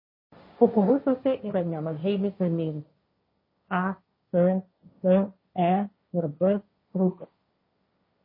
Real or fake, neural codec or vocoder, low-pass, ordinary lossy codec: fake; codec, 16 kHz, 1.1 kbps, Voila-Tokenizer; 5.4 kHz; MP3, 24 kbps